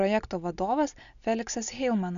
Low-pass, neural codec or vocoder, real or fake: 7.2 kHz; none; real